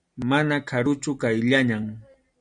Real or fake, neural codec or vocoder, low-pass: real; none; 9.9 kHz